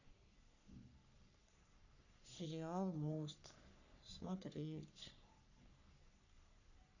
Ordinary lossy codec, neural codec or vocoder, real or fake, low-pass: none; codec, 44.1 kHz, 3.4 kbps, Pupu-Codec; fake; 7.2 kHz